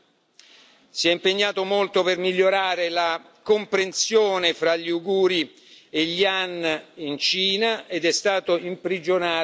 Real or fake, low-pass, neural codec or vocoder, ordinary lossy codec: real; none; none; none